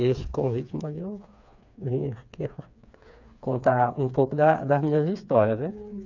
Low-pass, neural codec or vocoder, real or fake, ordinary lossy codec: 7.2 kHz; codec, 16 kHz, 4 kbps, FreqCodec, smaller model; fake; none